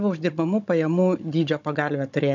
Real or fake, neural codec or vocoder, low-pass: fake; codec, 16 kHz, 16 kbps, FunCodec, trained on Chinese and English, 50 frames a second; 7.2 kHz